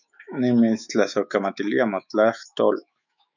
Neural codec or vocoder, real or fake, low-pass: autoencoder, 48 kHz, 128 numbers a frame, DAC-VAE, trained on Japanese speech; fake; 7.2 kHz